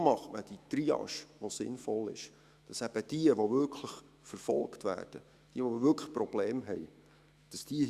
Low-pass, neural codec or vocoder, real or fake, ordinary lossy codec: 14.4 kHz; autoencoder, 48 kHz, 128 numbers a frame, DAC-VAE, trained on Japanese speech; fake; Opus, 64 kbps